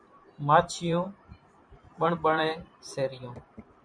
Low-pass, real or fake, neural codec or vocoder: 9.9 kHz; fake; vocoder, 44.1 kHz, 128 mel bands every 512 samples, BigVGAN v2